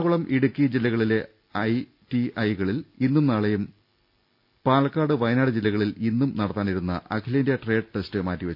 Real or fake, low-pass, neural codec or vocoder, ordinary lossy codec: real; 5.4 kHz; none; none